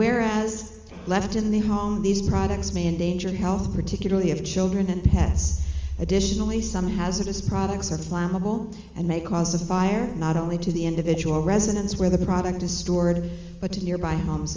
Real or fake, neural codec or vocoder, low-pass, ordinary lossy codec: real; none; 7.2 kHz; Opus, 32 kbps